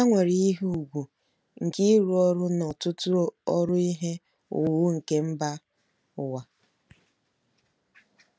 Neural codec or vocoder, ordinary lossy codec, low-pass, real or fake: none; none; none; real